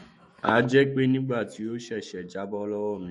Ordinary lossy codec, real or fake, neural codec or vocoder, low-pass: MP3, 48 kbps; fake; autoencoder, 48 kHz, 128 numbers a frame, DAC-VAE, trained on Japanese speech; 19.8 kHz